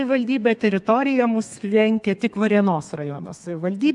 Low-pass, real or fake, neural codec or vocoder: 10.8 kHz; fake; codec, 32 kHz, 1.9 kbps, SNAC